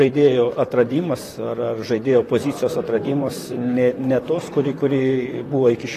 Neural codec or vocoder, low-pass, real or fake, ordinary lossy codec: vocoder, 44.1 kHz, 128 mel bands, Pupu-Vocoder; 14.4 kHz; fake; AAC, 48 kbps